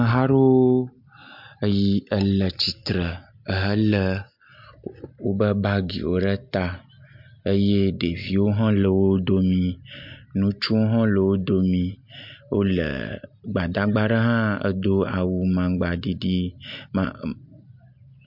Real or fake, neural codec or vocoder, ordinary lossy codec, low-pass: real; none; MP3, 48 kbps; 5.4 kHz